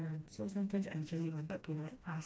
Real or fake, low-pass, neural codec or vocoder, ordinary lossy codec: fake; none; codec, 16 kHz, 1 kbps, FreqCodec, smaller model; none